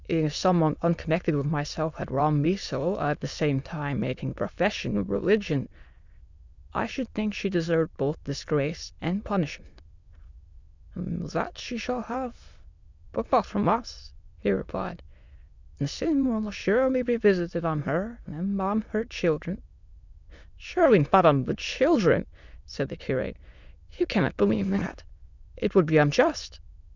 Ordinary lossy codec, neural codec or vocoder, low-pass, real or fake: Opus, 64 kbps; autoencoder, 22.05 kHz, a latent of 192 numbers a frame, VITS, trained on many speakers; 7.2 kHz; fake